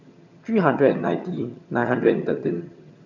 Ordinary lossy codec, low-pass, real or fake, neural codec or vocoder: none; 7.2 kHz; fake; vocoder, 22.05 kHz, 80 mel bands, HiFi-GAN